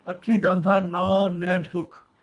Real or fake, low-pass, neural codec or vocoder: fake; 10.8 kHz; codec, 24 kHz, 1.5 kbps, HILCodec